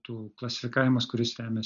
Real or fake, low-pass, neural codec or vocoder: real; 7.2 kHz; none